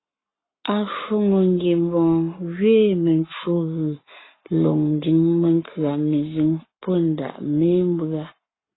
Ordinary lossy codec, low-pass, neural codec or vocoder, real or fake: AAC, 16 kbps; 7.2 kHz; codec, 44.1 kHz, 7.8 kbps, Pupu-Codec; fake